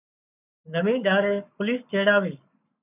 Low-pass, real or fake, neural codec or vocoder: 3.6 kHz; fake; codec, 44.1 kHz, 7.8 kbps, Pupu-Codec